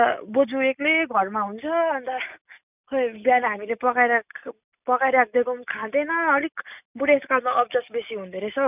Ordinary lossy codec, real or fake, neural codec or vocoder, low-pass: none; real; none; 3.6 kHz